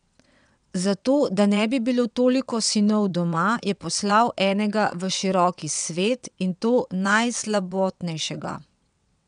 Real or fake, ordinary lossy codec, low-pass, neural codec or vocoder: fake; none; 9.9 kHz; vocoder, 22.05 kHz, 80 mel bands, WaveNeXt